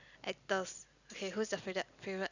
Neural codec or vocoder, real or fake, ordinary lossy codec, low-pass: none; real; none; 7.2 kHz